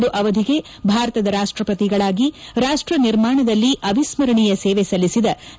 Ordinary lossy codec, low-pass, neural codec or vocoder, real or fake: none; none; none; real